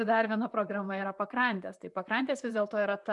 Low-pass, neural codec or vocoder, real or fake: 10.8 kHz; vocoder, 44.1 kHz, 128 mel bands, Pupu-Vocoder; fake